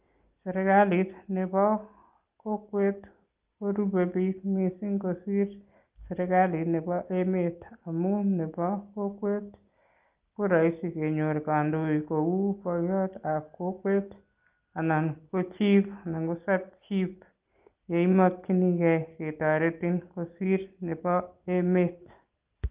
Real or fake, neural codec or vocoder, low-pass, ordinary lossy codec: real; none; 3.6 kHz; Opus, 32 kbps